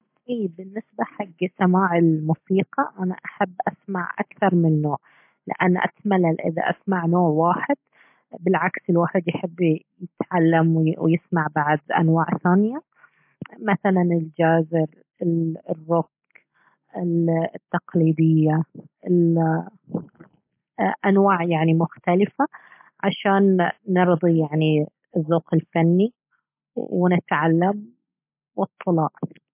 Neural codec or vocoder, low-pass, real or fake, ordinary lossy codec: none; 3.6 kHz; real; MP3, 32 kbps